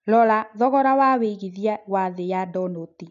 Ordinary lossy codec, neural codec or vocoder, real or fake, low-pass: none; none; real; 7.2 kHz